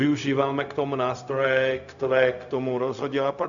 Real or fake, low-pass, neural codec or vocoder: fake; 7.2 kHz; codec, 16 kHz, 0.4 kbps, LongCat-Audio-Codec